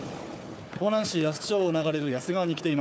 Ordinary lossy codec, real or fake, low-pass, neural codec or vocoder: none; fake; none; codec, 16 kHz, 4 kbps, FunCodec, trained on Chinese and English, 50 frames a second